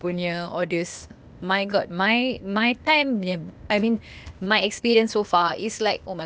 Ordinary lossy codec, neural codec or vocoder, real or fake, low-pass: none; codec, 16 kHz, 0.8 kbps, ZipCodec; fake; none